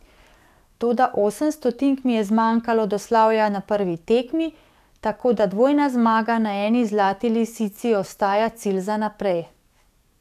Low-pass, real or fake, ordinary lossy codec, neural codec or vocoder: 14.4 kHz; fake; none; codec, 44.1 kHz, 7.8 kbps, DAC